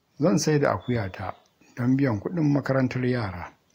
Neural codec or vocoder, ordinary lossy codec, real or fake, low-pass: none; AAC, 48 kbps; real; 19.8 kHz